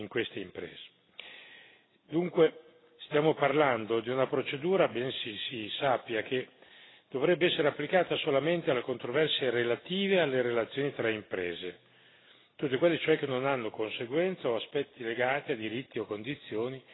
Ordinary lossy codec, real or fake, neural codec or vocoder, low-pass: AAC, 16 kbps; real; none; 7.2 kHz